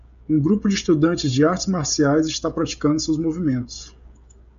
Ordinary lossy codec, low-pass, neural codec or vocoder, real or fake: AAC, 96 kbps; 7.2 kHz; codec, 16 kHz, 16 kbps, FreqCodec, smaller model; fake